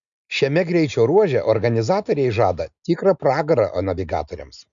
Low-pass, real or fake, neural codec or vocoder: 7.2 kHz; real; none